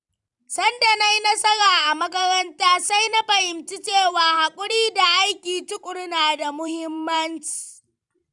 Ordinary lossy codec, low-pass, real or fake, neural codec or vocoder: none; 10.8 kHz; fake; vocoder, 44.1 kHz, 128 mel bands every 512 samples, BigVGAN v2